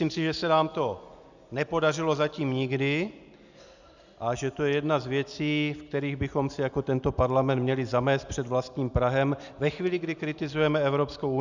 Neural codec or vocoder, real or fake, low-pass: none; real; 7.2 kHz